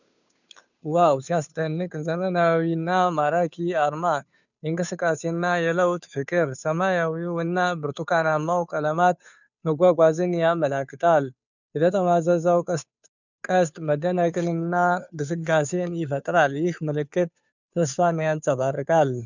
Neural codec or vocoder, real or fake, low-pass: codec, 16 kHz, 2 kbps, FunCodec, trained on Chinese and English, 25 frames a second; fake; 7.2 kHz